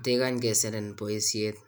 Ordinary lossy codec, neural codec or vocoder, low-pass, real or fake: none; none; none; real